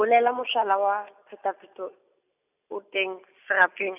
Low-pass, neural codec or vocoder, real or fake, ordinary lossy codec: 3.6 kHz; none; real; none